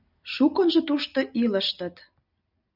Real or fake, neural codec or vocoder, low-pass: real; none; 5.4 kHz